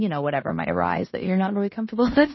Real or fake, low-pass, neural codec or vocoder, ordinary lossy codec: fake; 7.2 kHz; codec, 16 kHz in and 24 kHz out, 0.9 kbps, LongCat-Audio-Codec, fine tuned four codebook decoder; MP3, 24 kbps